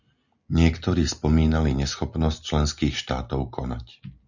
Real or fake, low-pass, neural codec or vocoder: real; 7.2 kHz; none